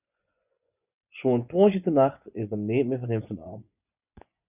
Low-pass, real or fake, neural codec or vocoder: 3.6 kHz; fake; vocoder, 24 kHz, 100 mel bands, Vocos